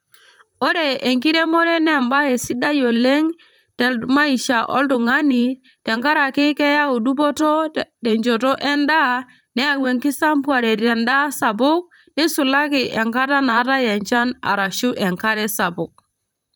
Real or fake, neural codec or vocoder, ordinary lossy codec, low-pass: fake; vocoder, 44.1 kHz, 128 mel bands, Pupu-Vocoder; none; none